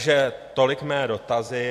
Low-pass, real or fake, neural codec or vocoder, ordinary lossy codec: 14.4 kHz; fake; vocoder, 44.1 kHz, 128 mel bands every 256 samples, BigVGAN v2; AAC, 64 kbps